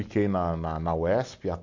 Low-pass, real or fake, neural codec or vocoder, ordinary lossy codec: 7.2 kHz; real; none; AAC, 48 kbps